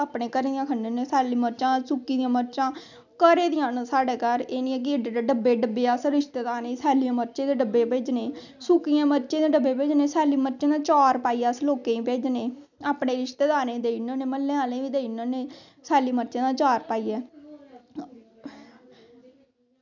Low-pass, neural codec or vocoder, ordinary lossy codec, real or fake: 7.2 kHz; none; none; real